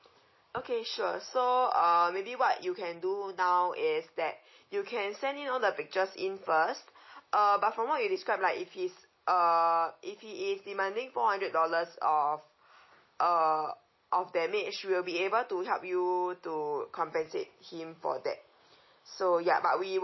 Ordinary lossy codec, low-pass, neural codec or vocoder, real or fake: MP3, 24 kbps; 7.2 kHz; none; real